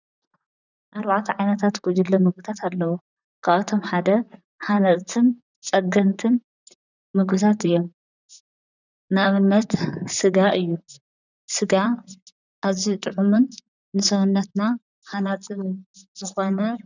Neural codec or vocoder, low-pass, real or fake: vocoder, 44.1 kHz, 128 mel bands, Pupu-Vocoder; 7.2 kHz; fake